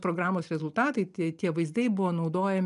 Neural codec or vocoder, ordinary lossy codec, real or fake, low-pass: none; MP3, 96 kbps; real; 10.8 kHz